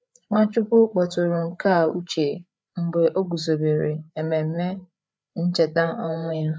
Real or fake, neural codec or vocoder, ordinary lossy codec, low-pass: fake; codec, 16 kHz, 8 kbps, FreqCodec, larger model; none; none